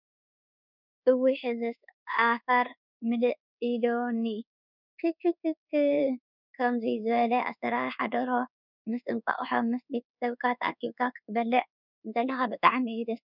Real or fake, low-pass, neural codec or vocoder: fake; 5.4 kHz; codec, 24 kHz, 1.2 kbps, DualCodec